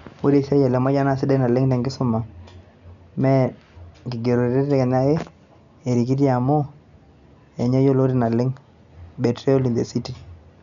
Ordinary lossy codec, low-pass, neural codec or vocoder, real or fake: none; 7.2 kHz; none; real